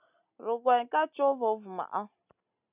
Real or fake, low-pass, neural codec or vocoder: real; 3.6 kHz; none